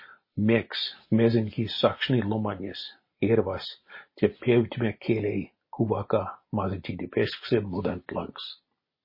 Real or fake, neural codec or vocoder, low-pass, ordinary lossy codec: real; none; 5.4 kHz; MP3, 24 kbps